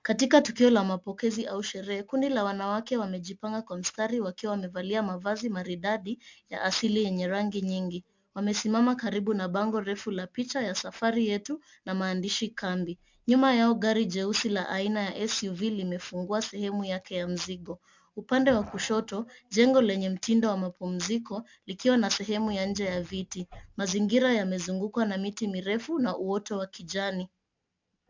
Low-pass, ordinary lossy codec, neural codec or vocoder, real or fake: 7.2 kHz; MP3, 64 kbps; none; real